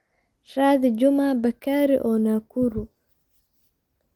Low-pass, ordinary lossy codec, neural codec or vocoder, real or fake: 19.8 kHz; Opus, 24 kbps; none; real